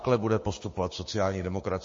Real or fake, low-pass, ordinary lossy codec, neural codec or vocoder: fake; 7.2 kHz; MP3, 32 kbps; codec, 16 kHz, 6 kbps, DAC